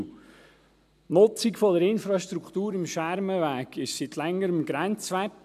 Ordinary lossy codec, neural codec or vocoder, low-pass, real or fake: AAC, 96 kbps; none; 14.4 kHz; real